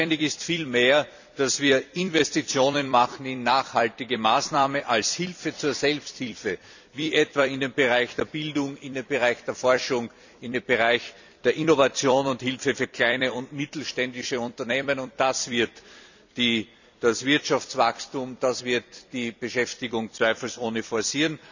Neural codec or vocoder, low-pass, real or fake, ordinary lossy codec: vocoder, 44.1 kHz, 128 mel bands every 256 samples, BigVGAN v2; 7.2 kHz; fake; none